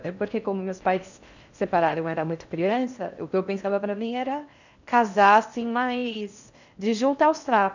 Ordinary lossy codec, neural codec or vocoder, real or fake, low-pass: none; codec, 16 kHz in and 24 kHz out, 0.6 kbps, FocalCodec, streaming, 4096 codes; fake; 7.2 kHz